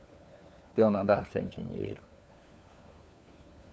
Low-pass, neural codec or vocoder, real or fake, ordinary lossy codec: none; codec, 16 kHz, 4 kbps, FunCodec, trained on LibriTTS, 50 frames a second; fake; none